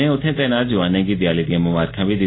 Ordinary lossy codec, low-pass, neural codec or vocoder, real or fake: AAC, 16 kbps; 7.2 kHz; none; real